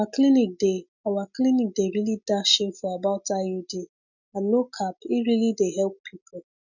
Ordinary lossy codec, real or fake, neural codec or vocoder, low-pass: none; real; none; 7.2 kHz